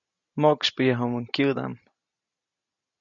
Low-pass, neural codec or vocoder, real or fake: 7.2 kHz; none; real